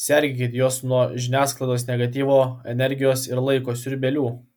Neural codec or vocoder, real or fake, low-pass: none; real; 19.8 kHz